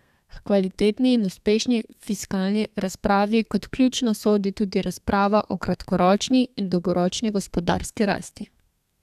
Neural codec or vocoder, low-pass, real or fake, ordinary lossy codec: codec, 32 kHz, 1.9 kbps, SNAC; 14.4 kHz; fake; none